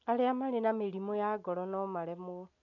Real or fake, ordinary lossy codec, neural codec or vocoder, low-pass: real; none; none; 7.2 kHz